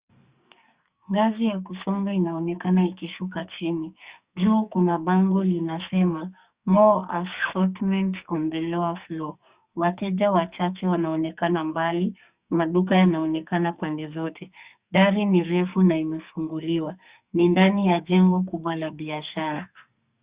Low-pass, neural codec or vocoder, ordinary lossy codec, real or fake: 3.6 kHz; codec, 44.1 kHz, 2.6 kbps, SNAC; Opus, 64 kbps; fake